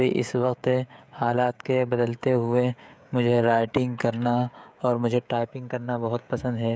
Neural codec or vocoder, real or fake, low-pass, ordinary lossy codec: codec, 16 kHz, 16 kbps, FreqCodec, smaller model; fake; none; none